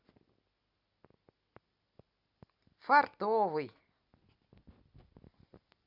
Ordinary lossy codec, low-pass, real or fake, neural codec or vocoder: Opus, 64 kbps; 5.4 kHz; real; none